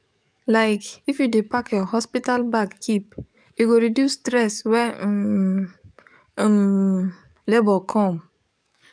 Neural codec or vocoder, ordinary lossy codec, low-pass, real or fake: codec, 44.1 kHz, 7.8 kbps, DAC; none; 9.9 kHz; fake